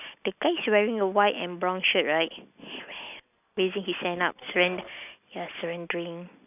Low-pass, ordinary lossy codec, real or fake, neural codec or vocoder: 3.6 kHz; none; real; none